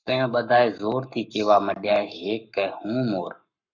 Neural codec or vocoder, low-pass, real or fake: codec, 44.1 kHz, 7.8 kbps, Pupu-Codec; 7.2 kHz; fake